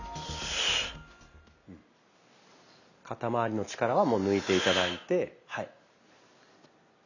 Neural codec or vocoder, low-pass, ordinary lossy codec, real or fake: none; 7.2 kHz; none; real